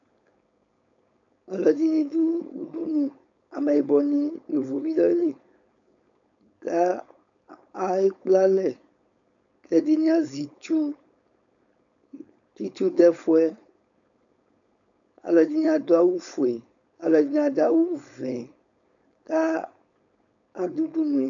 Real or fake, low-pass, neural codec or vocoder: fake; 7.2 kHz; codec, 16 kHz, 4.8 kbps, FACodec